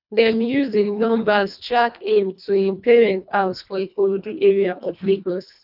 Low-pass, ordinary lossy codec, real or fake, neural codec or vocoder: 5.4 kHz; none; fake; codec, 24 kHz, 1.5 kbps, HILCodec